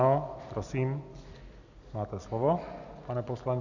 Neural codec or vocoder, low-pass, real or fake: none; 7.2 kHz; real